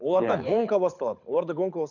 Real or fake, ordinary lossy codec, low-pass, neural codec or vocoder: fake; none; 7.2 kHz; codec, 24 kHz, 6 kbps, HILCodec